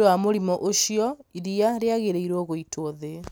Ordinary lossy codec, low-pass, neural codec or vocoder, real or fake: none; none; none; real